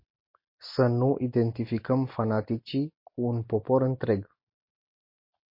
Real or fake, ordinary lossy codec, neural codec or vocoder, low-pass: real; MP3, 32 kbps; none; 5.4 kHz